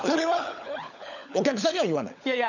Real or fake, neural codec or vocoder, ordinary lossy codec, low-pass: fake; codec, 16 kHz, 16 kbps, FunCodec, trained on LibriTTS, 50 frames a second; none; 7.2 kHz